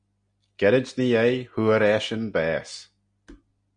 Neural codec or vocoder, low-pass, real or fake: none; 9.9 kHz; real